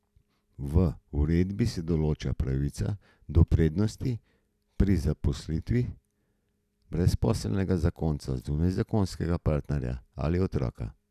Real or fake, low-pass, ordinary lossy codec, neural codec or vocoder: real; 14.4 kHz; none; none